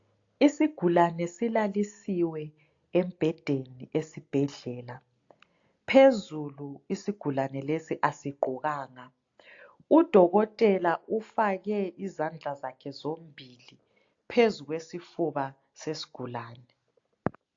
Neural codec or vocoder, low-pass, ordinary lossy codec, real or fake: none; 7.2 kHz; AAC, 48 kbps; real